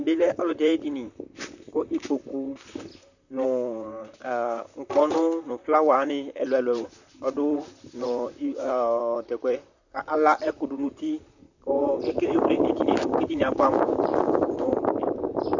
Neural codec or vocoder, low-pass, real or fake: vocoder, 44.1 kHz, 128 mel bands, Pupu-Vocoder; 7.2 kHz; fake